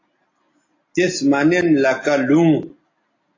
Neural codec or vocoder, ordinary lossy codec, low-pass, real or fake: none; AAC, 32 kbps; 7.2 kHz; real